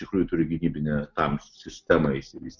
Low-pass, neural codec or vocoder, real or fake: 7.2 kHz; none; real